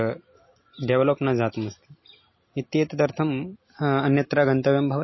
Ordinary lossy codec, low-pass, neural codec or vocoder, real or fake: MP3, 24 kbps; 7.2 kHz; none; real